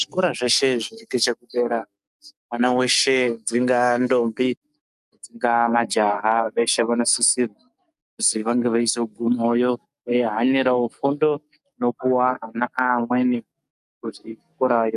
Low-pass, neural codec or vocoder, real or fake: 14.4 kHz; codec, 44.1 kHz, 7.8 kbps, DAC; fake